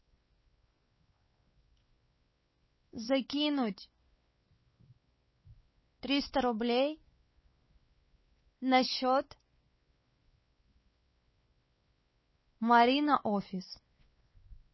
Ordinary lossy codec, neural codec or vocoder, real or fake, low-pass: MP3, 24 kbps; codec, 16 kHz, 4 kbps, X-Codec, WavLM features, trained on Multilingual LibriSpeech; fake; 7.2 kHz